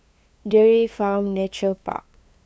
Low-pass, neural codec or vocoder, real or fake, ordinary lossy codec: none; codec, 16 kHz, 2 kbps, FunCodec, trained on LibriTTS, 25 frames a second; fake; none